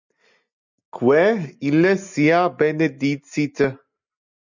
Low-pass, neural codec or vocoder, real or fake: 7.2 kHz; none; real